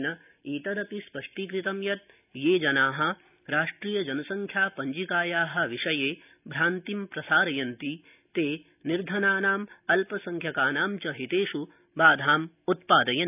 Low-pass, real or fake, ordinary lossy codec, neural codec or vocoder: 3.6 kHz; real; none; none